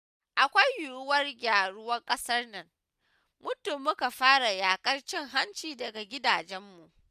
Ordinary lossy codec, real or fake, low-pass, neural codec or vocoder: none; real; 14.4 kHz; none